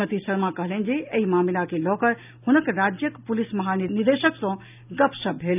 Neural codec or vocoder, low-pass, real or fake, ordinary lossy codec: none; 3.6 kHz; real; none